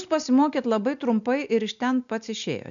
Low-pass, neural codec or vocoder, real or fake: 7.2 kHz; none; real